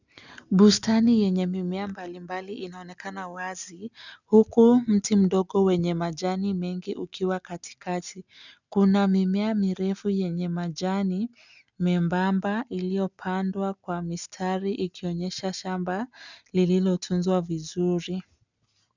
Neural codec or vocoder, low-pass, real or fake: none; 7.2 kHz; real